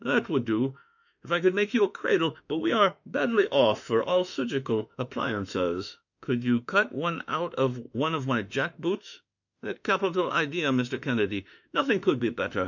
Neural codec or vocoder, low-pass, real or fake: autoencoder, 48 kHz, 32 numbers a frame, DAC-VAE, trained on Japanese speech; 7.2 kHz; fake